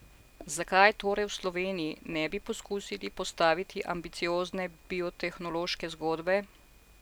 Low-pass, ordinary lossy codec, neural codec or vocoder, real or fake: none; none; none; real